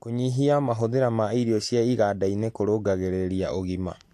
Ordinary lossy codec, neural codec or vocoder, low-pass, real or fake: AAC, 64 kbps; none; 14.4 kHz; real